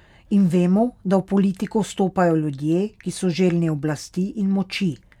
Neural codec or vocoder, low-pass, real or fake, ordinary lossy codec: none; 19.8 kHz; real; none